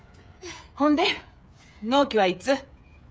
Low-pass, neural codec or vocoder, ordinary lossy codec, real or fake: none; codec, 16 kHz, 16 kbps, FreqCodec, smaller model; none; fake